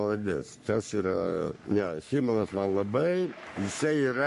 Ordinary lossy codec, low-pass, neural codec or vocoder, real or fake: MP3, 48 kbps; 14.4 kHz; codec, 44.1 kHz, 3.4 kbps, Pupu-Codec; fake